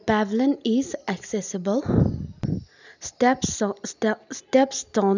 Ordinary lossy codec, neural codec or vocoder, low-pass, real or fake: none; none; 7.2 kHz; real